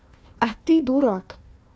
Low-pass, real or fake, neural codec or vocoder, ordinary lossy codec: none; fake; codec, 16 kHz, 1 kbps, FunCodec, trained on Chinese and English, 50 frames a second; none